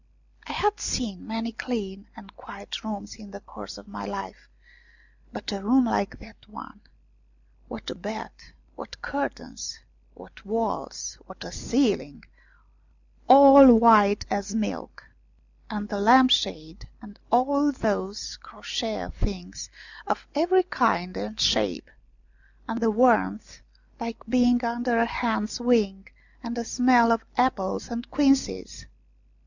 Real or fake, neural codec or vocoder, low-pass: real; none; 7.2 kHz